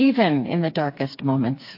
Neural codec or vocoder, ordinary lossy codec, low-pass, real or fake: codec, 16 kHz, 4 kbps, FreqCodec, smaller model; MP3, 32 kbps; 5.4 kHz; fake